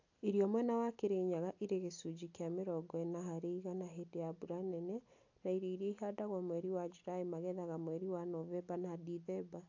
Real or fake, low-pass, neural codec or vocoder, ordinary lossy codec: real; 7.2 kHz; none; AAC, 48 kbps